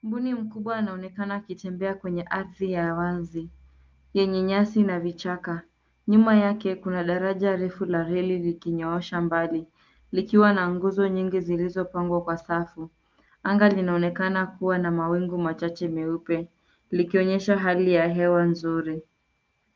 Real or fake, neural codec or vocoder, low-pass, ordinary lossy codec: real; none; 7.2 kHz; Opus, 24 kbps